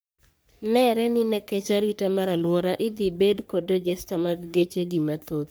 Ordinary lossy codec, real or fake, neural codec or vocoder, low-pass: none; fake; codec, 44.1 kHz, 3.4 kbps, Pupu-Codec; none